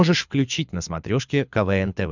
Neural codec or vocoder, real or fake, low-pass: codec, 24 kHz, 6 kbps, HILCodec; fake; 7.2 kHz